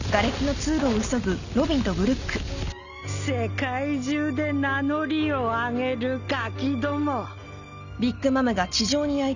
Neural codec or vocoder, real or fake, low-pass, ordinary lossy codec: none; real; 7.2 kHz; none